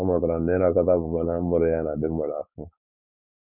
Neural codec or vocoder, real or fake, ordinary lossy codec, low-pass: codec, 16 kHz, 4.8 kbps, FACodec; fake; none; 3.6 kHz